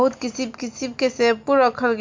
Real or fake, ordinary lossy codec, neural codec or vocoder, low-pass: real; MP3, 64 kbps; none; 7.2 kHz